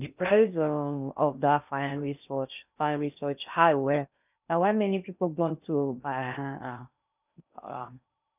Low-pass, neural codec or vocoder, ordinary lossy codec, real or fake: 3.6 kHz; codec, 16 kHz in and 24 kHz out, 0.6 kbps, FocalCodec, streaming, 4096 codes; none; fake